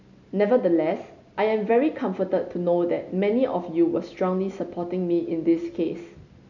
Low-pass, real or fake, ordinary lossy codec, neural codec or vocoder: 7.2 kHz; real; none; none